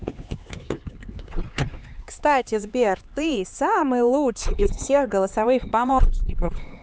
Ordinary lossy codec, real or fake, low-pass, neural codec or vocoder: none; fake; none; codec, 16 kHz, 4 kbps, X-Codec, HuBERT features, trained on LibriSpeech